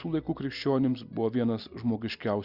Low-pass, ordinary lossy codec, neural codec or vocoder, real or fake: 5.4 kHz; Opus, 64 kbps; none; real